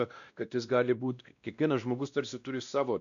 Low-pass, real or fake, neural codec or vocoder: 7.2 kHz; fake; codec, 16 kHz, 1 kbps, X-Codec, WavLM features, trained on Multilingual LibriSpeech